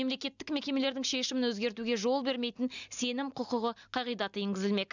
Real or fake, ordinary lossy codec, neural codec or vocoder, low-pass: real; none; none; 7.2 kHz